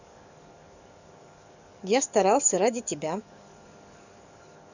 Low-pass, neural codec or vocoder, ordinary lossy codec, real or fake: 7.2 kHz; codec, 44.1 kHz, 7.8 kbps, DAC; none; fake